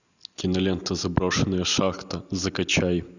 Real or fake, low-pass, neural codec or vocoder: real; 7.2 kHz; none